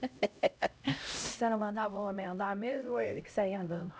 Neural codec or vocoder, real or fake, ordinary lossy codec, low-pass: codec, 16 kHz, 0.5 kbps, X-Codec, HuBERT features, trained on LibriSpeech; fake; none; none